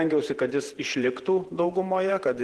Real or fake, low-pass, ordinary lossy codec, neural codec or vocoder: fake; 10.8 kHz; Opus, 16 kbps; vocoder, 48 kHz, 128 mel bands, Vocos